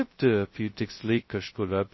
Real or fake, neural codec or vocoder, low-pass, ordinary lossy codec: fake; codec, 16 kHz, 0.2 kbps, FocalCodec; 7.2 kHz; MP3, 24 kbps